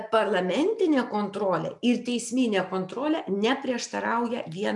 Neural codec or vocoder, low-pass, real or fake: none; 10.8 kHz; real